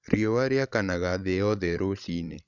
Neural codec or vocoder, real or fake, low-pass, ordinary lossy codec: codec, 16 kHz, 16 kbps, FreqCodec, larger model; fake; 7.2 kHz; none